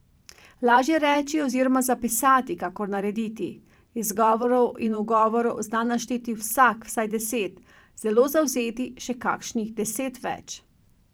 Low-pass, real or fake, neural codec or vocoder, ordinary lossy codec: none; fake; vocoder, 44.1 kHz, 128 mel bands every 512 samples, BigVGAN v2; none